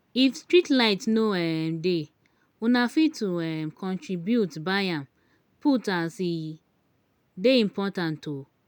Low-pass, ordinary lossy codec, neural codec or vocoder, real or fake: 19.8 kHz; none; none; real